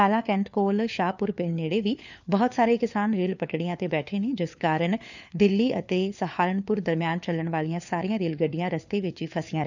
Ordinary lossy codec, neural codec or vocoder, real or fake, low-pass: none; codec, 16 kHz, 4 kbps, FunCodec, trained on LibriTTS, 50 frames a second; fake; 7.2 kHz